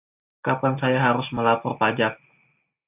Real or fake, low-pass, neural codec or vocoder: real; 3.6 kHz; none